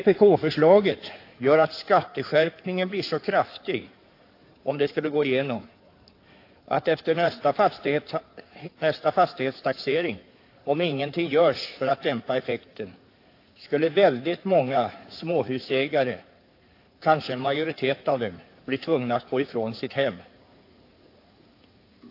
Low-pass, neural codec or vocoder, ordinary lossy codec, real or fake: 5.4 kHz; codec, 16 kHz in and 24 kHz out, 2.2 kbps, FireRedTTS-2 codec; AAC, 32 kbps; fake